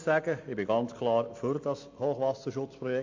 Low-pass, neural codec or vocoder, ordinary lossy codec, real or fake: 7.2 kHz; none; MP3, 48 kbps; real